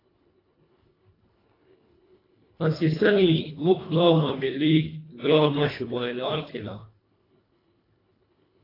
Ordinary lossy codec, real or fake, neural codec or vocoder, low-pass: AAC, 24 kbps; fake; codec, 24 kHz, 1.5 kbps, HILCodec; 5.4 kHz